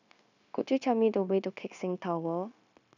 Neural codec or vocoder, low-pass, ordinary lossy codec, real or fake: codec, 16 kHz, 0.9 kbps, LongCat-Audio-Codec; 7.2 kHz; none; fake